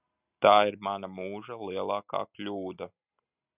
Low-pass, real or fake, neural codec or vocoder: 3.6 kHz; real; none